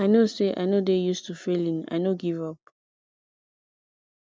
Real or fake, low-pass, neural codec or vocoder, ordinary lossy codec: real; none; none; none